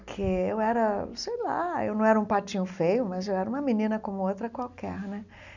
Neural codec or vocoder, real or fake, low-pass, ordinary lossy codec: none; real; 7.2 kHz; none